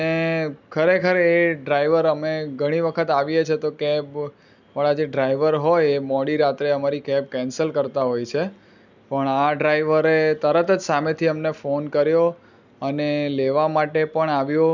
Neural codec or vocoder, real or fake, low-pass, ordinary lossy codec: none; real; 7.2 kHz; none